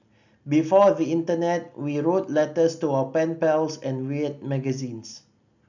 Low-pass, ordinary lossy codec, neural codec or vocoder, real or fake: 7.2 kHz; none; none; real